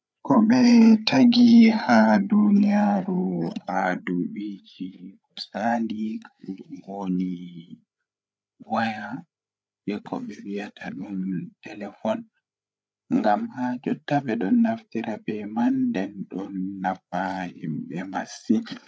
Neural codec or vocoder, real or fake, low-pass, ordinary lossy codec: codec, 16 kHz, 8 kbps, FreqCodec, larger model; fake; none; none